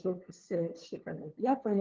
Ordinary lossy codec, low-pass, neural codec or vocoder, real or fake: Opus, 16 kbps; 7.2 kHz; codec, 16 kHz, 2 kbps, FunCodec, trained on LibriTTS, 25 frames a second; fake